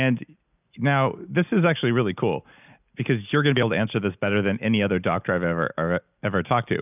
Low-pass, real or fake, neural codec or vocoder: 3.6 kHz; real; none